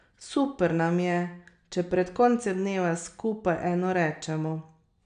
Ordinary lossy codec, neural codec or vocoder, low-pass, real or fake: none; none; 9.9 kHz; real